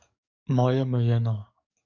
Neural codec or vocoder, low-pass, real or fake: codec, 44.1 kHz, 7.8 kbps, DAC; 7.2 kHz; fake